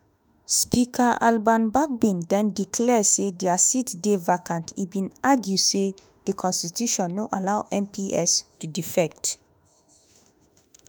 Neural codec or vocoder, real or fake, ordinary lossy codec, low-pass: autoencoder, 48 kHz, 32 numbers a frame, DAC-VAE, trained on Japanese speech; fake; none; none